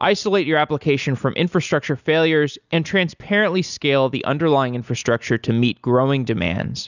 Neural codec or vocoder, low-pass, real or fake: none; 7.2 kHz; real